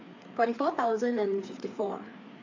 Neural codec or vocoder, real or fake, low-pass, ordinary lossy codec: codec, 16 kHz, 4 kbps, FreqCodec, larger model; fake; 7.2 kHz; AAC, 48 kbps